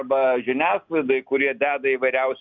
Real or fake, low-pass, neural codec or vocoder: real; 7.2 kHz; none